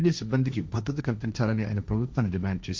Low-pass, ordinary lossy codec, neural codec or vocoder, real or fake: none; none; codec, 16 kHz, 1.1 kbps, Voila-Tokenizer; fake